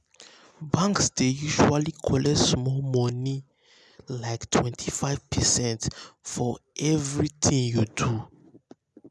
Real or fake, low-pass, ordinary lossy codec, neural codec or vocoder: real; none; none; none